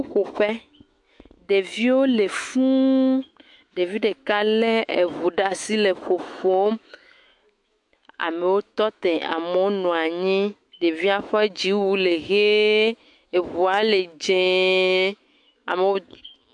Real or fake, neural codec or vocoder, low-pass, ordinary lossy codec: fake; autoencoder, 48 kHz, 128 numbers a frame, DAC-VAE, trained on Japanese speech; 10.8 kHz; MP3, 64 kbps